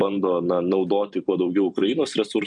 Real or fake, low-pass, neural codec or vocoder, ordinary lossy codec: fake; 10.8 kHz; vocoder, 44.1 kHz, 128 mel bands every 256 samples, BigVGAN v2; MP3, 96 kbps